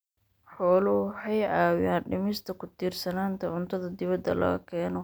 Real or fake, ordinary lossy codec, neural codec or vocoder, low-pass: real; none; none; none